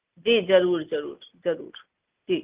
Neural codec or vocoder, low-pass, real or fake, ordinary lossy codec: none; 3.6 kHz; real; Opus, 24 kbps